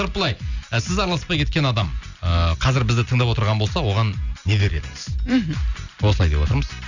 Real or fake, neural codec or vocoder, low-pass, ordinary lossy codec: real; none; 7.2 kHz; none